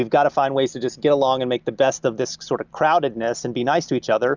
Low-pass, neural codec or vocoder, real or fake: 7.2 kHz; none; real